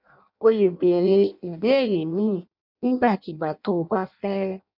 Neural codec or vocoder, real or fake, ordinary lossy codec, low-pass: codec, 16 kHz in and 24 kHz out, 0.6 kbps, FireRedTTS-2 codec; fake; none; 5.4 kHz